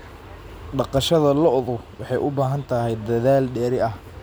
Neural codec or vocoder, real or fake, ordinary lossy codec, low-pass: none; real; none; none